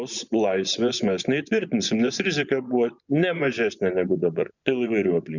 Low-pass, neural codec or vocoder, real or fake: 7.2 kHz; vocoder, 24 kHz, 100 mel bands, Vocos; fake